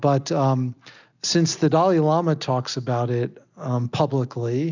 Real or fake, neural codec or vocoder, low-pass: real; none; 7.2 kHz